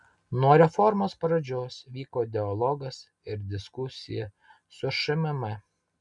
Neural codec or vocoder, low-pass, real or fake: none; 10.8 kHz; real